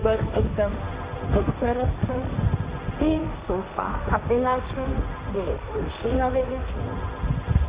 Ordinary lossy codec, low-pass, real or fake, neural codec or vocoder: Opus, 64 kbps; 3.6 kHz; fake; codec, 16 kHz, 1.1 kbps, Voila-Tokenizer